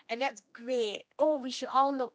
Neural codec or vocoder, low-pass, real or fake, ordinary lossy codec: codec, 16 kHz, 1 kbps, X-Codec, HuBERT features, trained on general audio; none; fake; none